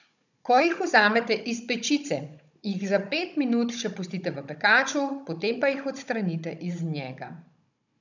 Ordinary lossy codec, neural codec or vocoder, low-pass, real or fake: none; codec, 16 kHz, 16 kbps, FunCodec, trained on Chinese and English, 50 frames a second; 7.2 kHz; fake